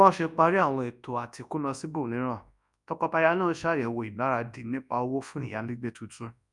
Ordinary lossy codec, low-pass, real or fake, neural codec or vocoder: none; 10.8 kHz; fake; codec, 24 kHz, 0.9 kbps, WavTokenizer, large speech release